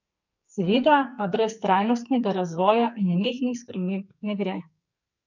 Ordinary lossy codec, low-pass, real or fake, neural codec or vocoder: none; 7.2 kHz; fake; codec, 44.1 kHz, 2.6 kbps, SNAC